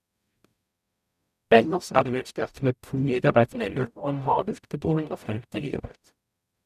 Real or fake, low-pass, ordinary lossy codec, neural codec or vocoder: fake; 14.4 kHz; none; codec, 44.1 kHz, 0.9 kbps, DAC